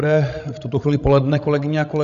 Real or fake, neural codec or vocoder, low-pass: fake; codec, 16 kHz, 16 kbps, FreqCodec, larger model; 7.2 kHz